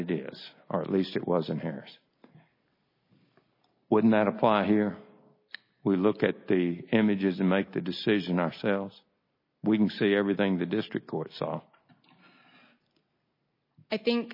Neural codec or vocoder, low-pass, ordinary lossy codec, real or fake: none; 5.4 kHz; MP3, 24 kbps; real